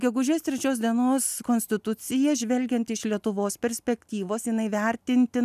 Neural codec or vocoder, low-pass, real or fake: none; 14.4 kHz; real